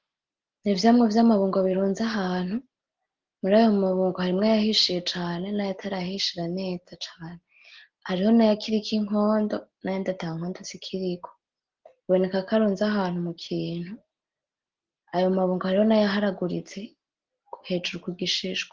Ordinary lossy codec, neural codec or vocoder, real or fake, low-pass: Opus, 16 kbps; none; real; 7.2 kHz